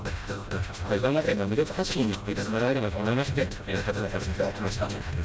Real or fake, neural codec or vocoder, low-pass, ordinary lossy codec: fake; codec, 16 kHz, 0.5 kbps, FreqCodec, smaller model; none; none